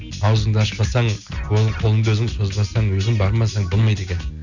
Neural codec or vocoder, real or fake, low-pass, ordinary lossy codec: none; real; 7.2 kHz; Opus, 64 kbps